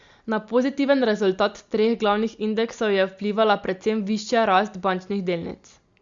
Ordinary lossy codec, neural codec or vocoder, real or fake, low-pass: Opus, 64 kbps; none; real; 7.2 kHz